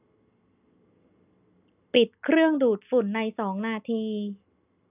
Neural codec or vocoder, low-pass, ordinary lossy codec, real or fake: none; 3.6 kHz; none; real